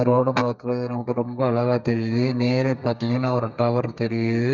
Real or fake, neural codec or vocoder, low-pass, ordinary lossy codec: fake; codec, 32 kHz, 1.9 kbps, SNAC; 7.2 kHz; none